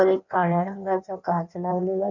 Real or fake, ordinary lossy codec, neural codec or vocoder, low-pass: fake; none; codec, 16 kHz in and 24 kHz out, 1.1 kbps, FireRedTTS-2 codec; 7.2 kHz